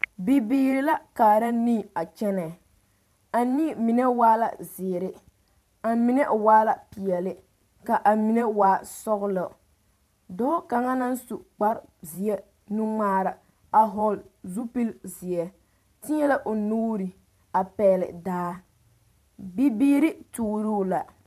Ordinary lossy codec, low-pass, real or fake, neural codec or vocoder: MP3, 96 kbps; 14.4 kHz; fake; vocoder, 44.1 kHz, 128 mel bands every 512 samples, BigVGAN v2